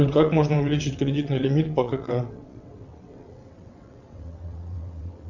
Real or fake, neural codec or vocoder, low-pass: fake; vocoder, 22.05 kHz, 80 mel bands, WaveNeXt; 7.2 kHz